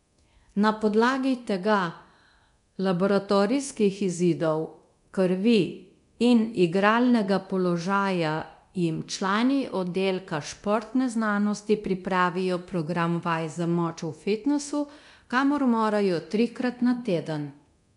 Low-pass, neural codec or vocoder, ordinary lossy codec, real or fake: 10.8 kHz; codec, 24 kHz, 0.9 kbps, DualCodec; none; fake